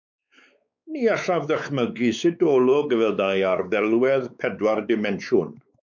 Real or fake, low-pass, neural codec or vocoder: fake; 7.2 kHz; codec, 16 kHz, 4 kbps, X-Codec, WavLM features, trained on Multilingual LibriSpeech